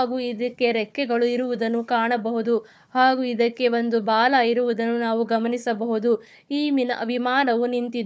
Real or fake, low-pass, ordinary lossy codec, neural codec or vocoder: fake; none; none; codec, 16 kHz, 4 kbps, FunCodec, trained on Chinese and English, 50 frames a second